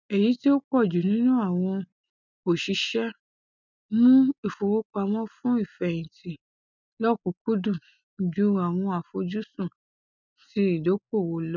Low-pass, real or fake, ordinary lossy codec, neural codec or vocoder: 7.2 kHz; real; none; none